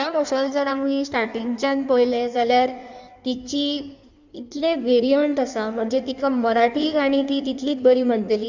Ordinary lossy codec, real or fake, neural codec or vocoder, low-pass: none; fake; codec, 16 kHz in and 24 kHz out, 1.1 kbps, FireRedTTS-2 codec; 7.2 kHz